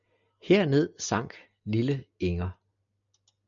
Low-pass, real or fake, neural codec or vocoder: 7.2 kHz; real; none